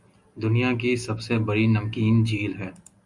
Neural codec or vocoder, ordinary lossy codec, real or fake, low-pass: none; Opus, 64 kbps; real; 10.8 kHz